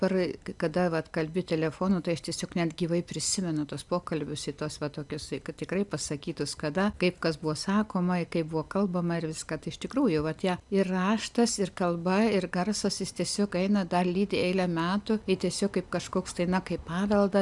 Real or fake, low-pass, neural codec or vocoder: real; 10.8 kHz; none